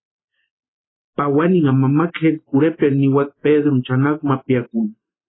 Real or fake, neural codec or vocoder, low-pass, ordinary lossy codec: real; none; 7.2 kHz; AAC, 16 kbps